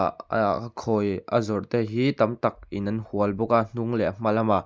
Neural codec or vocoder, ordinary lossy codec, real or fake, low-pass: none; none; real; none